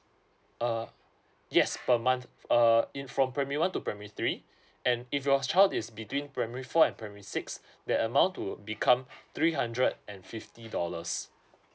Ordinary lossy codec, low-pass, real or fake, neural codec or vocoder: none; none; real; none